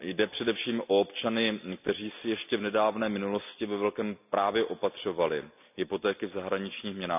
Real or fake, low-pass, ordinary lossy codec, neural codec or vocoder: real; 3.6 kHz; none; none